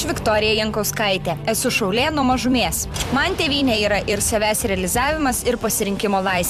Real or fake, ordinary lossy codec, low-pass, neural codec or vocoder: fake; MP3, 96 kbps; 14.4 kHz; vocoder, 44.1 kHz, 128 mel bands every 256 samples, BigVGAN v2